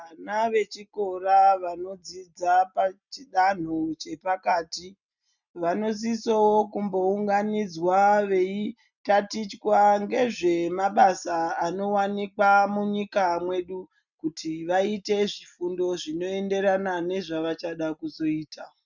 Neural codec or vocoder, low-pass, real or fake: none; 7.2 kHz; real